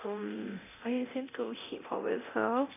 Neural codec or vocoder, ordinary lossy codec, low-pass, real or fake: codec, 24 kHz, 0.9 kbps, DualCodec; none; 3.6 kHz; fake